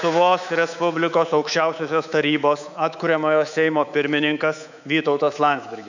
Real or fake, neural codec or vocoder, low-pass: fake; codec, 24 kHz, 3.1 kbps, DualCodec; 7.2 kHz